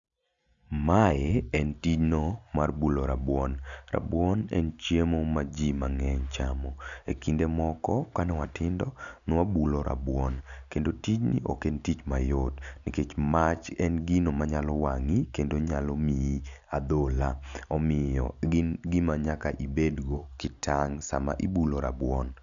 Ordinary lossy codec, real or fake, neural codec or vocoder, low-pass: none; real; none; 7.2 kHz